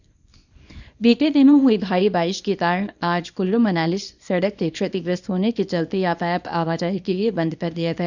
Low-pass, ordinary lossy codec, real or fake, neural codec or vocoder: 7.2 kHz; none; fake; codec, 24 kHz, 0.9 kbps, WavTokenizer, small release